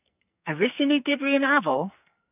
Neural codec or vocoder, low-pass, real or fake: codec, 44.1 kHz, 2.6 kbps, SNAC; 3.6 kHz; fake